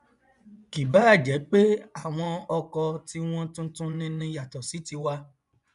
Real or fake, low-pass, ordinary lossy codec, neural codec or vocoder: fake; 10.8 kHz; none; vocoder, 24 kHz, 100 mel bands, Vocos